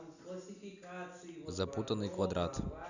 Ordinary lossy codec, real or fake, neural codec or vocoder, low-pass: none; real; none; 7.2 kHz